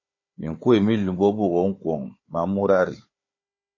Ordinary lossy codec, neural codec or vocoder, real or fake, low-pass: MP3, 32 kbps; codec, 16 kHz, 4 kbps, FunCodec, trained on Chinese and English, 50 frames a second; fake; 7.2 kHz